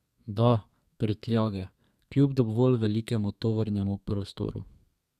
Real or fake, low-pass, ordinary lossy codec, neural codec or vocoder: fake; 14.4 kHz; none; codec, 32 kHz, 1.9 kbps, SNAC